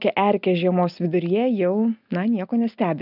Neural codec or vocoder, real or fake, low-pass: none; real; 5.4 kHz